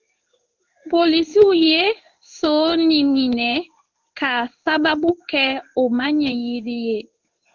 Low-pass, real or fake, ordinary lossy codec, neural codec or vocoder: 7.2 kHz; fake; Opus, 24 kbps; codec, 16 kHz in and 24 kHz out, 1 kbps, XY-Tokenizer